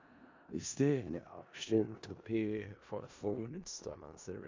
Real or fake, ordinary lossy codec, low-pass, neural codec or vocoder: fake; MP3, 64 kbps; 7.2 kHz; codec, 16 kHz in and 24 kHz out, 0.4 kbps, LongCat-Audio-Codec, four codebook decoder